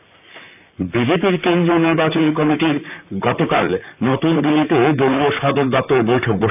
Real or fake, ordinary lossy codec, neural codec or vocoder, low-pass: fake; none; vocoder, 44.1 kHz, 128 mel bands, Pupu-Vocoder; 3.6 kHz